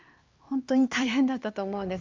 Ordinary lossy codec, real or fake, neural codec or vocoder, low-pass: Opus, 32 kbps; fake; codec, 16 kHz, 4 kbps, X-Codec, HuBERT features, trained on LibriSpeech; 7.2 kHz